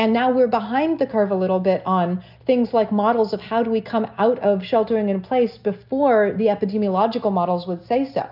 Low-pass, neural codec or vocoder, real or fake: 5.4 kHz; none; real